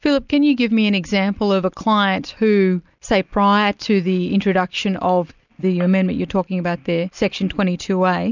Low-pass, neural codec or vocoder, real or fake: 7.2 kHz; none; real